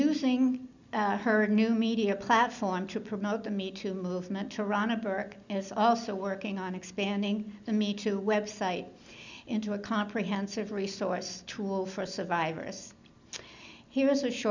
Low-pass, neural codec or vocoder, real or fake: 7.2 kHz; none; real